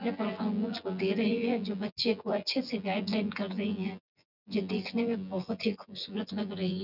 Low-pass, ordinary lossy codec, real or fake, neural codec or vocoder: 5.4 kHz; none; fake; vocoder, 24 kHz, 100 mel bands, Vocos